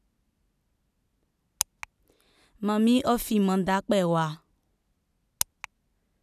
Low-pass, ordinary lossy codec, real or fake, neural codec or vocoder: 14.4 kHz; none; real; none